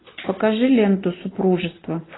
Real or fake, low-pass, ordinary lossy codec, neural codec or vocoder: real; 7.2 kHz; AAC, 16 kbps; none